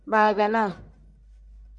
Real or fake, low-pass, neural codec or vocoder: fake; 10.8 kHz; codec, 44.1 kHz, 1.7 kbps, Pupu-Codec